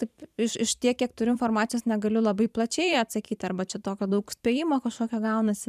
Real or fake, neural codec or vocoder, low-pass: fake; vocoder, 44.1 kHz, 128 mel bands every 512 samples, BigVGAN v2; 14.4 kHz